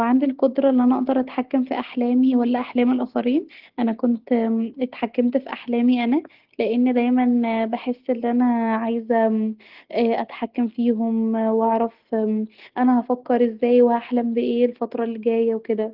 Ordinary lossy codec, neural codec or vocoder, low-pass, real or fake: Opus, 16 kbps; none; 5.4 kHz; real